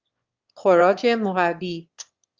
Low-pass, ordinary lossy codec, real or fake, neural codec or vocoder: 7.2 kHz; Opus, 32 kbps; fake; autoencoder, 22.05 kHz, a latent of 192 numbers a frame, VITS, trained on one speaker